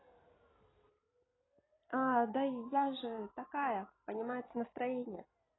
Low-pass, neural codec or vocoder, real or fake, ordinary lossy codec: 7.2 kHz; vocoder, 44.1 kHz, 128 mel bands every 256 samples, BigVGAN v2; fake; AAC, 16 kbps